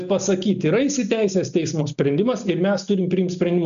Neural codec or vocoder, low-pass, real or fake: none; 7.2 kHz; real